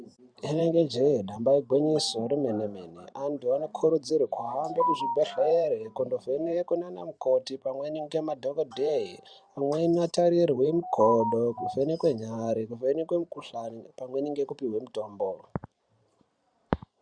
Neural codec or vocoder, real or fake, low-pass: vocoder, 44.1 kHz, 128 mel bands every 512 samples, BigVGAN v2; fake; 9.9 kHz